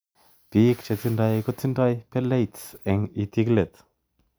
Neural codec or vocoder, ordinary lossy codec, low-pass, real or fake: none; none; none; real